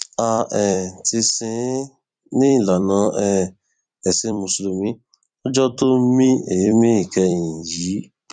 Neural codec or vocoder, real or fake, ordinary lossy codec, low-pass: vocoder, 44.1 kHz, 128 mel bands every 256 samples, BigVGAN v2; fake; none; 9.9 kHz